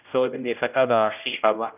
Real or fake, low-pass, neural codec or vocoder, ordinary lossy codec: fake; 3.6 kHz; codec, 16 kHz, 0.5 kbps, X-Codec, HuBERT features, trained on general audio; none